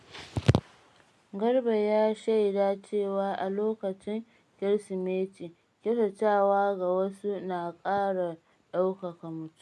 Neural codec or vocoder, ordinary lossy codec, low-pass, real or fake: none; none; none; real